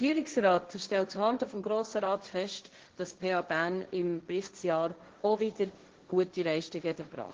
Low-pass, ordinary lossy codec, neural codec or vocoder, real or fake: 7.2 kHz; Opus, 16 kbps; codec, 16 kHz, 1.1 kbps, Voila-Tokenizer; fake